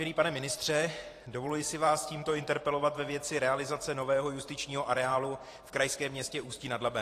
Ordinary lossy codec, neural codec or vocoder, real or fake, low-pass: AAC, 48 kbps; vocoder, 44.1 kHz, 128 mel bands every 512 samples, BigVGAN v2; fake; 14.4 kHz